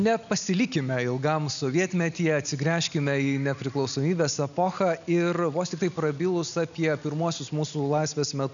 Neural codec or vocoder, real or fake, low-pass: none; real; 7.2 kHz